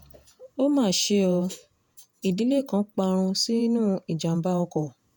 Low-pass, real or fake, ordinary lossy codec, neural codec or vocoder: none; fake; none; vocoder, 48 kHz, 128 mel bands, Vocos